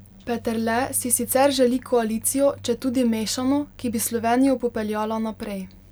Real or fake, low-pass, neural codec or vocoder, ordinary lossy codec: real; none; none; none